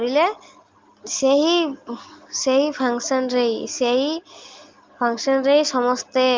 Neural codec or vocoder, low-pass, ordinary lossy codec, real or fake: none; 7.2 kHz; Opus, 32 kbps; real